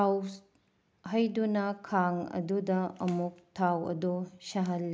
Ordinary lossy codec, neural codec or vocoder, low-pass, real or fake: none; none; none; real